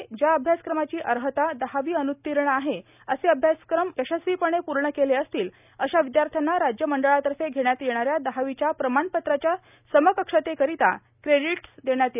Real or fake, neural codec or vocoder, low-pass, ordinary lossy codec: real; none; 3.6 kHz; none